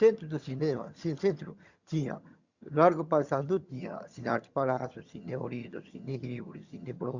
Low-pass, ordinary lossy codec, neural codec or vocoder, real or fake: 7.2 kHz; Opus, 64 kbps; vocoder, 22.05 kHz, 80 mel bands, HiFi-GAN; fake